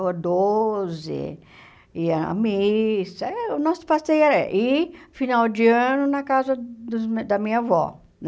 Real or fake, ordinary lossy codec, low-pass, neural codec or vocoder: real; none; none; none